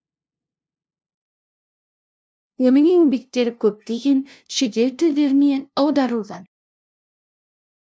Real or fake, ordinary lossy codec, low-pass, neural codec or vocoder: fake; none; none; codec, 16 kHz, 0.5 kbps, FunCodec, trained on LibriTTS, 25 frames a second